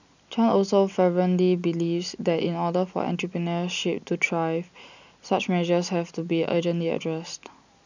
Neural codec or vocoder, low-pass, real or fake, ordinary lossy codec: none; 7.2 kHz; real; none